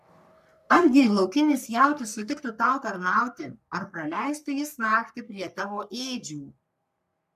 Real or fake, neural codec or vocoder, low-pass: fake; codec, 44.1 kHz, 3.4 kbps, Pupu-Codec; 14.4 kHz